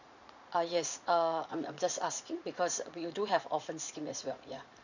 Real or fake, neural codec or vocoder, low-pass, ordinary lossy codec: fake; vocoder, 44.1 kHz, 80 mel bands, Vocos; 7.2 kHz; none